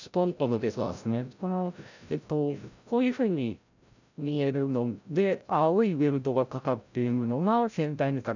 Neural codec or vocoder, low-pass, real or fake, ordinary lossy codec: codec, 16 kHz, 0.5 kbps, FreqCodec, larger model; 7.2 kHz; fake; none